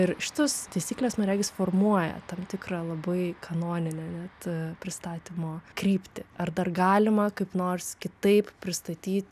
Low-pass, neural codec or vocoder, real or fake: 14.4 kHz; none; real